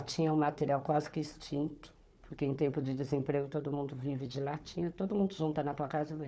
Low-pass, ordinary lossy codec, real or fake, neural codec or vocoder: none; none; fake; codec, 16 kHz, 4 kbps, FunCodec, trained on Chinese and English, 50 frames a second